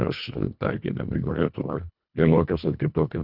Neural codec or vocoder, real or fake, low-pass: codec, 24 kHz, 1.5 kbps, HILCodec; fake; 5.4 kHz